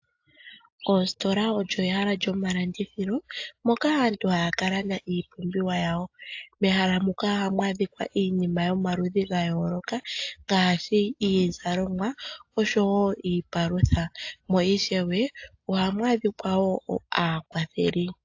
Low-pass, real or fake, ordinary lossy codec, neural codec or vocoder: 7.2 kHz; real; AAC, 48 kbps; none